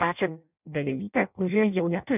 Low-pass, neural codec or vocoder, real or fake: 3.6 kHz; codec, 16 kHz in and 24 kHz out, 0.6 kbps, FireRedTTS-2 codec; fake